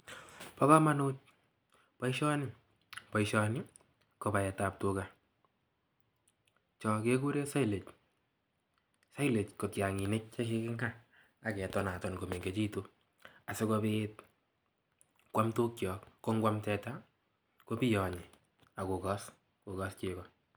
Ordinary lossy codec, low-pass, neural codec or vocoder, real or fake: none; none; none; real